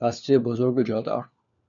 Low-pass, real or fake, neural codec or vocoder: 7.2 kHz; fake; codec, 16 kHz, 4 kbps, FunCodec, trained on LibriTTS, 50 frames a second